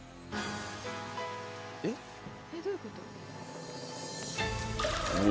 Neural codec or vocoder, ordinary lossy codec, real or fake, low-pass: none; none; real; none